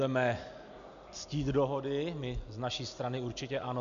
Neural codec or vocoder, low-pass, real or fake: none; 7.2 kHz; real